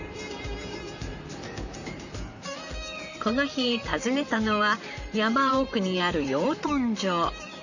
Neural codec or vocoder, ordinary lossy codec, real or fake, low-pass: vocoder, 44.1 kHz, 128 mel bands, Pupu-Vocoder; none; fake; 7.2 kHz